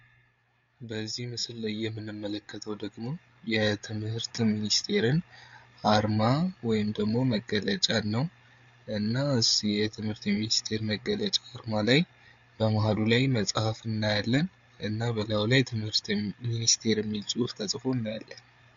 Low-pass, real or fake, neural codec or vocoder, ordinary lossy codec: 7.2 kHz; fake; codec, 16 kHz, 8 kbps, FreqCodec, larger model; MP3, 64 kbps